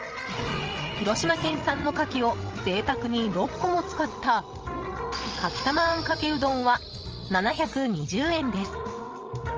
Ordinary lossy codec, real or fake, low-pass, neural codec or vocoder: Opus, 24 kbps; fake; 7.2 kHz; codec, 16 kHz, 16 kbps, FreqCodec, larger model